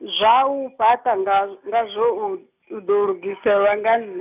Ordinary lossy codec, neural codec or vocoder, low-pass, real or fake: none; none; 3.6 kHz; real